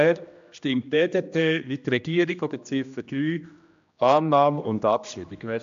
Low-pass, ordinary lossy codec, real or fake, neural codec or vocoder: 7.2 kHz; MP3, 64 kbps; fake; codec, 16 kHz, 1 kbps, X-Codec, HuBERT features, trained on general audio